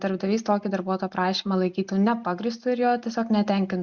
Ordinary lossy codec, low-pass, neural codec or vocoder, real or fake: Opus, 64 kbps; 7.2 kHz; none; real